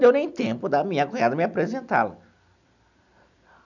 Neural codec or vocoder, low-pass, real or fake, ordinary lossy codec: none; 7.2 kHz; real; none